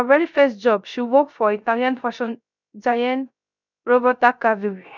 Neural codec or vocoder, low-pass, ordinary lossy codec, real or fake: codec, 16 kHz, 0.3 kbps, FocalCodec; 7.2 kHz; none; fake